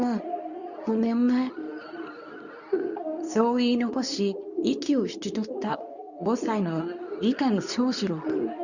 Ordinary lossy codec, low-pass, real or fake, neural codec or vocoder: none; 7.2 kHz; fake; codec, 24 kHz, 0.9 kbps, WavTokenizer, medium speech release version 2